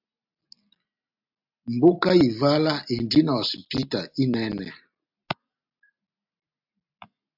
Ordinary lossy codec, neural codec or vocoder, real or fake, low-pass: AAC, 48 kbps; none; real; 5.4 kHz